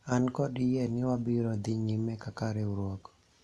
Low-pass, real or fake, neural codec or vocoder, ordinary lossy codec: none; real; none; none